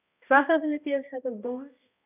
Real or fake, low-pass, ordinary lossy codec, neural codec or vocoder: fake; 3.6 kHz; none; codec, 16 kHz, 1 kbps, X-Codec, HuBERT features, trained on balanced general audio